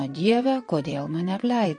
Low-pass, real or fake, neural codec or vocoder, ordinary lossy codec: 9.9 kHz; fake; vocoder, 22.05 kHz, 80 mel bands, Vocos; MP3, 48 kbps